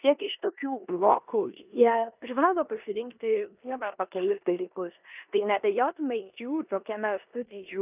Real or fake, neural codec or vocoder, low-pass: fake; codec, 16 kHz in and 24 kHz out, 0.9 kbps, LongCat-Audio-Codec, four codebook decoder; 3.6 kHz